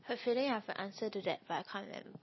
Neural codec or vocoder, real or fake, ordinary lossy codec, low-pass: none; real; MP3, 24 kbps; 7.2 kHz